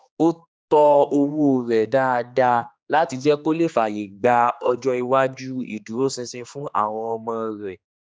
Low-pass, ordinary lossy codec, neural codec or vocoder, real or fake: none; none; codec, 16 kHz, 2 kbps, X-Codec, HuBERT features, trained on general audio; fake